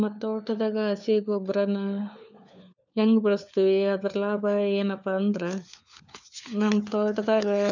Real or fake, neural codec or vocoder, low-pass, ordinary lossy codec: fake; codec, 16 kHz, 4 kbps, FunCodec, trained on LibriTTS, 50 frames a second; 7.2 kHz; none